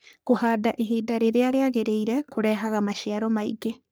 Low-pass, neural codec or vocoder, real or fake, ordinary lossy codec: none; codec, 44.1 kHz, 3.4 kbps, Pupu-Codec; fake; none